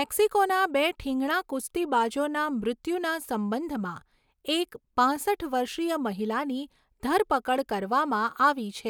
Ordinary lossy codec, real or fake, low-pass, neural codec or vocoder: none; real; none; none